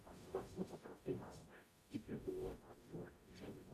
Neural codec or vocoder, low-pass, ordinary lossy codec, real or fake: codec, 44.1 kHz, 0.9 kbps, DAC; 14.4 kHz; AAC, 96 kbps; fake